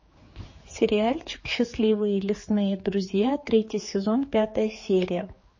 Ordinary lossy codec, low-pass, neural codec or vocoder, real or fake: MP3, 32 kbps; 7.2 kHz; codec, 16 kHz, 4 kbps, X-Codec, HuBERT features, trained on general audio; fake